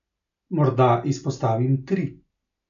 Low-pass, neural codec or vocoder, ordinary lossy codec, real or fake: 7.2 kHz; none; none; real